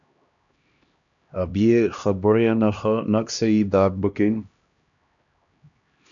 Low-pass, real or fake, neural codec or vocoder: 7.2 kHz; fake; codec, 16 kHz, 1 kbps, X-Codec, HuBERT features, trained on LibriSpeech